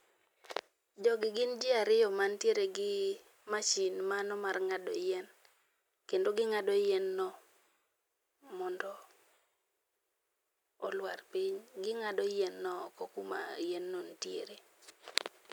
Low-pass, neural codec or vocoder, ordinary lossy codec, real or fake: none; none; none; real